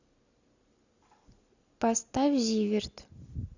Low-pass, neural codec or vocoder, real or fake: 7.2 kHz; none; real